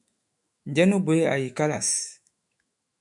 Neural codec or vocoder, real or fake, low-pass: autoencoder, 48 kHz, 128 numbers a frame, DAC-VAE, trained on Japanese speech; fake; 10.8 kHz